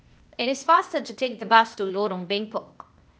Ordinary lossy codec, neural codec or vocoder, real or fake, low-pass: none; codec, 16 kHz, 0.8 kbps, ZipCodec; fake; none